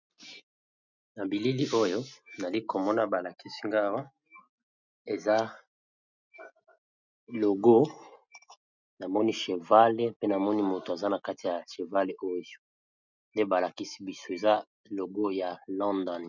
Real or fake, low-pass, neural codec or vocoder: real; 7.2 kHz; none